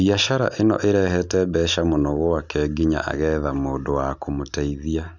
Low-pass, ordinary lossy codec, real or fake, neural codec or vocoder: 7.2 kHz; none; real; none